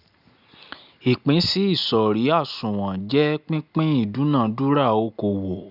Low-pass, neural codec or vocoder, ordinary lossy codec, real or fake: 5.4 kHz; none; none; real